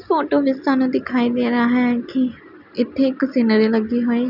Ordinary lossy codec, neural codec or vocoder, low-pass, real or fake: none; none; 5.4 kHz; real